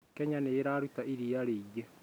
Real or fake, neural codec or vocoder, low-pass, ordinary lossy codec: real; none; none; none